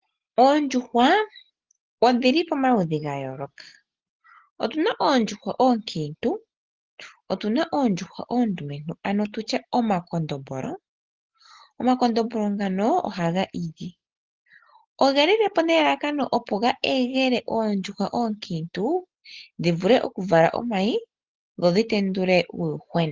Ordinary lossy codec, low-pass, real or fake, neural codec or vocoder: Opus, 16 kbps; 7.2 kHz; real; none